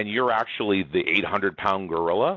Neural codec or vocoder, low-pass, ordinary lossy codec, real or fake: none; 7.2 kHz; AAC, 48 kbps; real